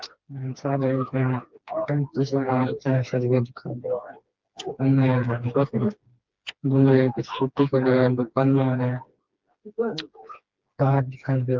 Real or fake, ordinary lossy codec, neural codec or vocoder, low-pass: fake; Opus, 24 kbps; codec, 16 kHz, 2 kbps, FreqCodec, smaller model; 7.2 kHz